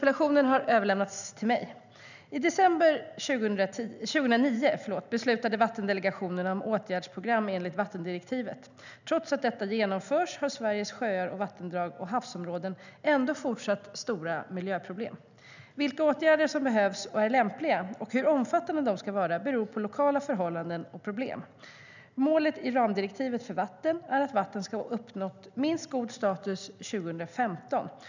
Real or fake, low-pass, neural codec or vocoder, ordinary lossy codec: real; 7.2 kHz; none; none